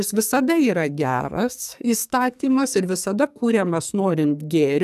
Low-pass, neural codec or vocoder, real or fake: 14.4 kHz; codec, 44.1 kHz, 2.6 kbps, SNAC; fake